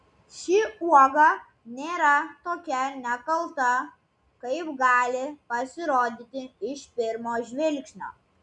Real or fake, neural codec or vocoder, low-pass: real; none; 10.8 kHz